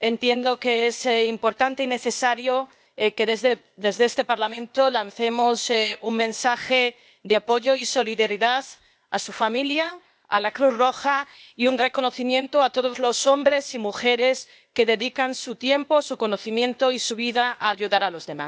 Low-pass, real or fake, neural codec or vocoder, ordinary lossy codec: none; fake; codec, 16 kHz, 0.8 kbps, ZipCodec; none